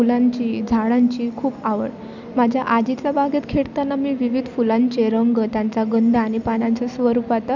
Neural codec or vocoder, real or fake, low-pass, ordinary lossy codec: none; real; 7.2 kHz; none